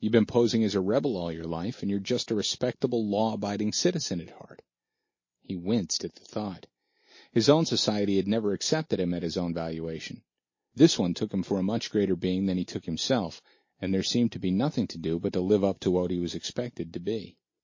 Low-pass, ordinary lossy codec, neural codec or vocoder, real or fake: 7.2 kHz; MP3, 32 kbps; none; real